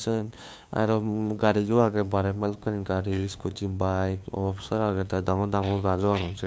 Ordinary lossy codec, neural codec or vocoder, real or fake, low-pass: none; codec, 16 kHz, 2 kbps, FunCodec, trained on LibriTTS, 25 frames a second; fake; none